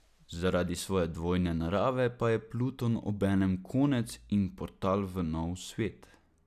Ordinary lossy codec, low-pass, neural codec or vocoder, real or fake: none; 14.4 kHz; none; real